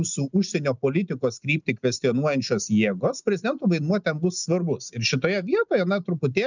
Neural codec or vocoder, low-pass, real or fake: none; 7.2 kHz; real